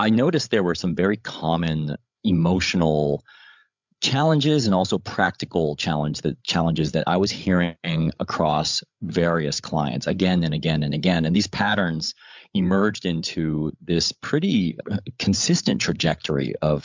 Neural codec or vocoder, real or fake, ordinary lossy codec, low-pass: codec, 16 kHz, 8 kbps, FreqCodec, larger model; fake; MP3, 64 kbps; 7.2 kHz